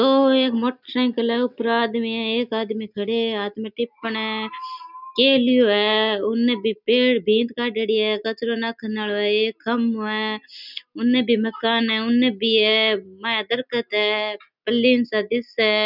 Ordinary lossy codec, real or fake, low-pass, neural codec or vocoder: none; real; 5.4 kHz; none